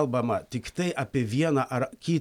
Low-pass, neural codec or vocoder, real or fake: 19.8 kHz; none; real